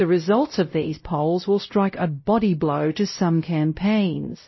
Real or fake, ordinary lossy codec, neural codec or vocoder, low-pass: fake; MP3, 24 kbps; codec, 16 kHz, 0.5 kbps, X-Codec, WavLM features, trained on Multilingual LibriSpeech; 7.2 kHz